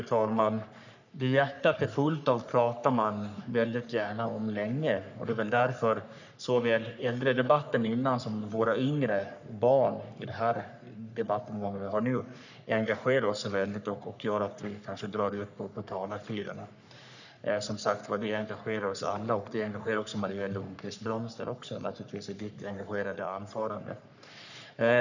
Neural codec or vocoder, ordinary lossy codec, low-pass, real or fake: codec, 44.1 kHz, 3.4 kbps, Pupu-Codec; none; 7.2 kHz; fake